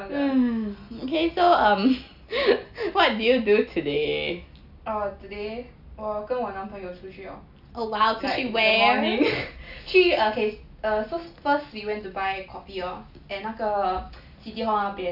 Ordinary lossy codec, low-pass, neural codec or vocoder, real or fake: AAC, 48 kbps; 5.4 kHz; none; real